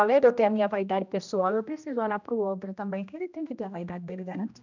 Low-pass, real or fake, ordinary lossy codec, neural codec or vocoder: 7.2 kHz; fake; none; codec, 16 kHz, 1 kbps, X-Codec, HuBERT features, trained on general audio